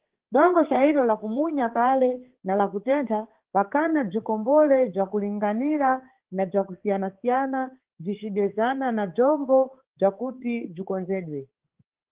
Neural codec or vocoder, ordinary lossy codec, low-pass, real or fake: codec, 16 kHz, 4 kbps, X-Codec, HuBERT features, trained on balanced general audio; Opus, 16 kbps; 3.6 kHz; fake